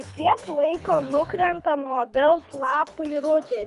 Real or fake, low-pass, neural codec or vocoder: fake; 10.8 kHz; codec, 24 kHz, 3 kbps, HILCodec